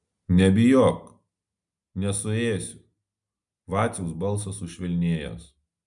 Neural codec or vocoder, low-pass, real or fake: none; 10.8 kHz; real